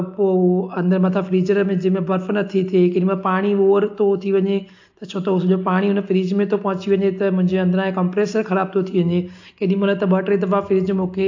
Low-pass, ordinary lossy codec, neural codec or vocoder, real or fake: 7.2 kHz; AAC, 48 kbps; none; real